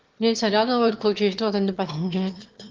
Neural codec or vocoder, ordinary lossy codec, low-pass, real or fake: autoencoder, 22.05 kHz, a latent of 192 numbers a frame, VITS, trained on one speaker; Opus, 16 kbps; 7.2 kHz; fake